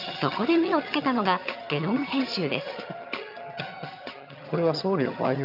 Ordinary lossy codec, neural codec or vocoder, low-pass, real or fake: none; vocoder, 22.05 kHz, 80 mel bands, HiFi-GAN; 5.4 kHz; fake